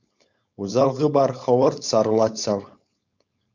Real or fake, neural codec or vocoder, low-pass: fake; codec, 16 kHz, 4.8 kbps, FACodec; 7.2 kHz